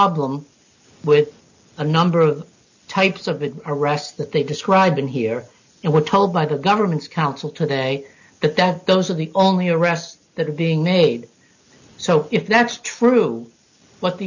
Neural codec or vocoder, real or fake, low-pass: none; real; 7.2 kHz